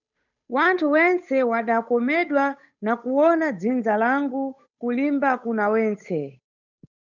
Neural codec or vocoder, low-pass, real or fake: codec, 16 kHz, 8 kbps, FunCodec, trained on Chinese and English, 25 frames a second; 7.2 kHz; fake